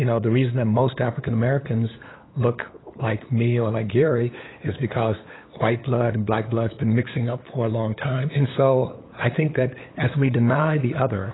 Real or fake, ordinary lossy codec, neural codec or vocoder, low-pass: fake; AAC, 16 kbps; codec, 16 kHz, 8 kbps, FunCodec, trained on LibriTTS, 25 frames a second; 7.2 kHz